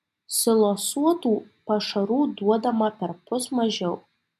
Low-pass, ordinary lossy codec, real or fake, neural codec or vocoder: 14.4 kHz; MP3, 96 kbps; real; none